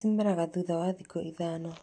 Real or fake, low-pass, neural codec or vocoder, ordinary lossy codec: fake; 9.9 kHz; vocoder, 44.1 kHz, 128 mel bands every 512 samples, BigVGAN v2; none